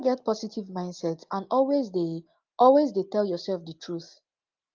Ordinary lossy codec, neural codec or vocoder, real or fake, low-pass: Opus, 32 kbps; none; real; 7.2 kHz